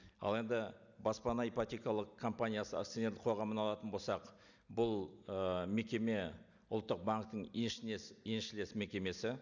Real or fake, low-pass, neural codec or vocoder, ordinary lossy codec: real; 7.2 kHz; none; none